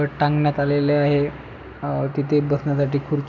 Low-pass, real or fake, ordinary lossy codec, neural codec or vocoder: 7.2 kHz; real; none; none